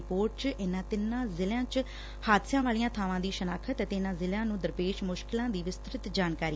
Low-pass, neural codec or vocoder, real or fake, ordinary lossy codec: none; none; real; none